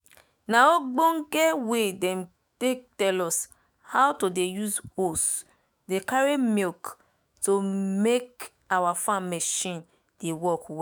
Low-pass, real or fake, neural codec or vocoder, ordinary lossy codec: none; fake; autoencoder, 48 kHz, 128 numbers a frame, DAC-VAE, trained on Japanese speech; none